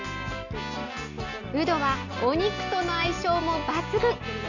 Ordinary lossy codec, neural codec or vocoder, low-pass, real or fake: none; none; 7.2 kHz; real